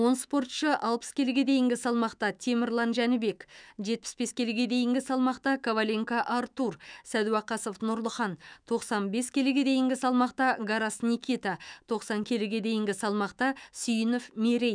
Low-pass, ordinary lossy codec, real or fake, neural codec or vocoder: 9.9 kHz; none; fake; autoencoder, 48 kHz, 128 numbers a frame, DAC-VAE, trained on Japanese speech